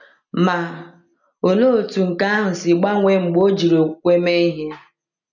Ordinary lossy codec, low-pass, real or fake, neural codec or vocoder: none; 7.2 kHz; real; none